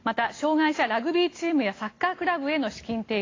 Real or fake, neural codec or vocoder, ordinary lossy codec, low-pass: real; none; AAC, 32 kbps; 7.2 kHz